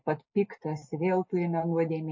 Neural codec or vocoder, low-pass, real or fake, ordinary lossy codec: none; 7.2 kHz; real; MP3, 32 kbps